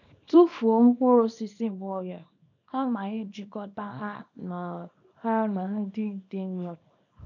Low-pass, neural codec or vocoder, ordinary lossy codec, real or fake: 7.2 kHz; codec, 24 kHz, 0.9 kbps, WavTokenizer, small release; none; fake